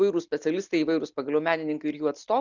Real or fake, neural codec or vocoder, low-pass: real; none; 7.2 kHz